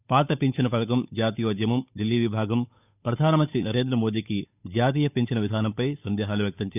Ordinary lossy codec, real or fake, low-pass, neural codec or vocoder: none; fake; 3.6 kHz; codec, 16 kHz, 8 kbps, FunCodec, trained on Chinese and English, 25 frames a second